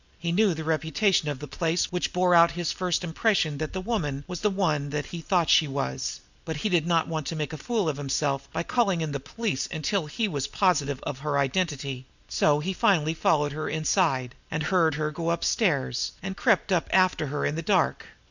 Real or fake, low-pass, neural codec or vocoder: real; 7.2 kHz; none